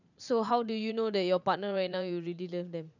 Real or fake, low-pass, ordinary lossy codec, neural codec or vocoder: fake; 7.2 kHz; none; vocoder, 44.1 kHz, 80 mel bands, Vocos